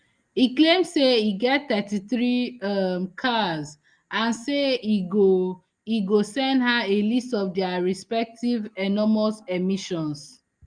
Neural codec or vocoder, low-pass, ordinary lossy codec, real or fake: none; 9.9 kHz; Opus, 32 kbps; real